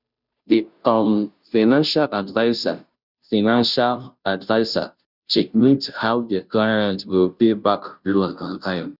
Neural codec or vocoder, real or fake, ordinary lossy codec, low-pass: codec, 16 kHz, 0.5 kbps, FunCodec, trained on Chinese and English, 25 frames a second; fake; none; 5.4 kHz